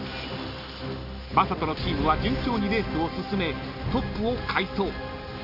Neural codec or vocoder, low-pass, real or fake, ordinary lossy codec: none; 5.4 kHz; real; none